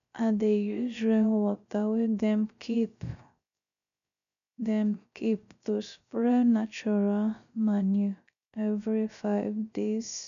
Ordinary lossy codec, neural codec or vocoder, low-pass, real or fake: none; codec, 16 kHz, 0.3 kbps, FocalCodec; 7.2 kHz; fake